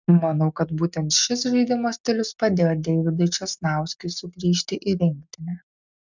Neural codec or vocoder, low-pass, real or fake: none; 7.2 kHz; real